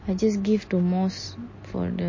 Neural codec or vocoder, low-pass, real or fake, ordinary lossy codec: none; 7.2 kHz; real; MP3, 32 kbps